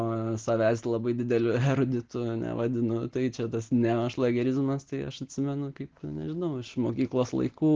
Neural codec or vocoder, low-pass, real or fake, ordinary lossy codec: none; 7.2 kHz; real; Opus, 16 kbps